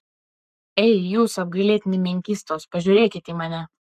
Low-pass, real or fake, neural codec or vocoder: 14.4 kHz; fake; codec, 44.1 kHz, 7.8 kbps, Pupu-Codec